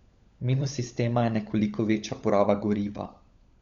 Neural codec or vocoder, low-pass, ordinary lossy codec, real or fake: codec, 16 kHz, 16 kbps, FunCodec, trained on LibriTTS, 50 frames a second; 7.2 kHz; Opus, 64 kbps; fake